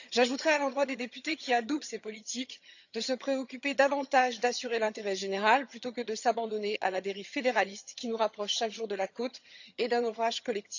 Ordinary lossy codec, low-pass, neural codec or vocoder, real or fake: none; 7.2 kHz; vocoder, 22.05 kHz, 80 mel bands, HiFi-GAN; fake